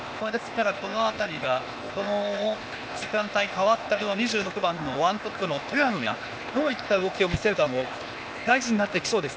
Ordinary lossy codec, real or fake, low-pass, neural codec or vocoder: none; fake; none; codec, 16 kHz, 0.8 kbps, ZipCodec